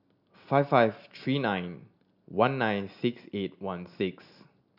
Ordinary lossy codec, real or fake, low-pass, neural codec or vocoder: none; real; 5.4 kHz; none